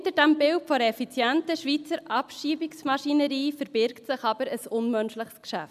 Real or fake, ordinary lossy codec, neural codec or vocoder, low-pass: real; none; none; 14.4 kHz